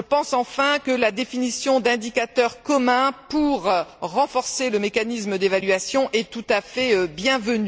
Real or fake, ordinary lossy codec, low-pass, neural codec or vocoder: real; none; none; none